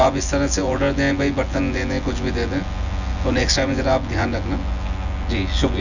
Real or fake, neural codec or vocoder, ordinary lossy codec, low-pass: fake; vocoder, 24 kHz, 100 mel bands, Vocos; none; 7.2 kHz